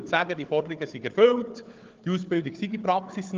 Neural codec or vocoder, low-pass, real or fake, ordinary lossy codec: codec, 16 kHz, 4 kbps, FunCodec, trained on Chinese and English, 50 frames a second; 7.2 kHz; fake; Opus, 24 kbps